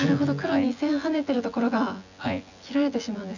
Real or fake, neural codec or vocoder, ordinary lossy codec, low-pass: fake; vocoder, 24 kHz, 100 mel bands, Vocos; none; 7.2 kHz